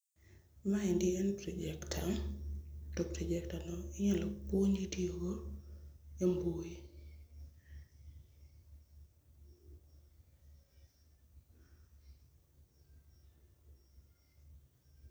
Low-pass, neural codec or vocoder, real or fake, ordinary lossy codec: none; none; real; none